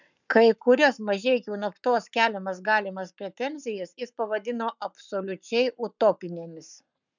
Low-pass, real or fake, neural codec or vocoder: 7.2 kHz; fake; codec, 44.1 kHz, 7.8 kbps, Pupu-Codec